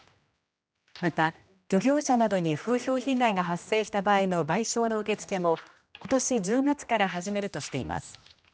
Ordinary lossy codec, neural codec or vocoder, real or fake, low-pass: none; codec, 16 kHz, 1 kbps, X-Codec, HuBERT features, trained on general audio; fake; none